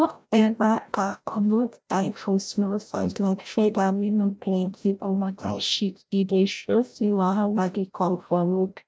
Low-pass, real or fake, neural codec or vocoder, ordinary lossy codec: none; fake; codec, 16 kHz, 0.5 kbps, FreqCodec, larger model; none